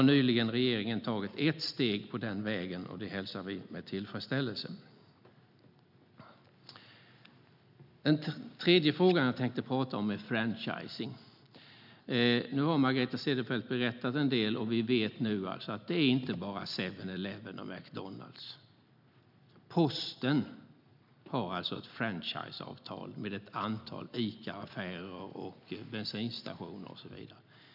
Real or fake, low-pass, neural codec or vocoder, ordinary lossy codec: real; 5.4 kHz; none; none